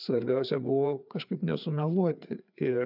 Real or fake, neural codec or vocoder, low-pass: fake; codec, 16 kHz, 4 kbps, FreqCodec, larger model; 5.4 kHz